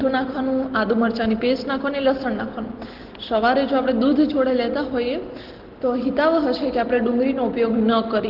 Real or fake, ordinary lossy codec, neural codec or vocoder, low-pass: real; Opus, 16 kbps; none; 5.4 kHz